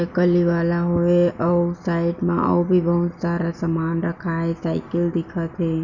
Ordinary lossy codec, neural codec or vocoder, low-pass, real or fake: none; none; 7.2 kHz; real